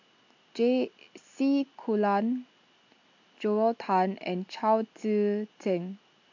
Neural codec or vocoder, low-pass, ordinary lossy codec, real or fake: none; 7.2 kHz; AAC, 48 kbps; real